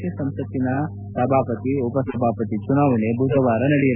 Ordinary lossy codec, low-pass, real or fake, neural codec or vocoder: none; 3.6 kHz; real; none